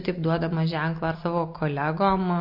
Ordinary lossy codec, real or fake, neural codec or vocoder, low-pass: MP3, 32 kbps; real; none; 5.4 kHz